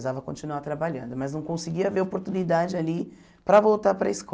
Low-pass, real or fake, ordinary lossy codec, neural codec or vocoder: none; real; none; none